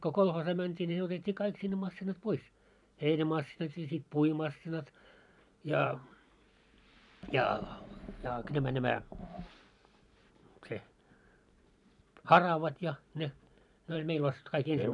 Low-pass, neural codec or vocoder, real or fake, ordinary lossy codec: 10.8 kHz; vocoder, 44.1 kHz, 128 mel bands every 512 samples, BigVGAN v2; fake; none